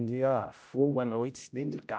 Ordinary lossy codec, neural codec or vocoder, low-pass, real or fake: none; codec, 16 kHz, 0.5 kbps, X-Codec, HuBERT features, trained on general audio; none; fake